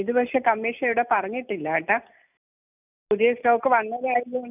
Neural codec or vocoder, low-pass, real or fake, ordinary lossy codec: none; 3.6 kHz; real; none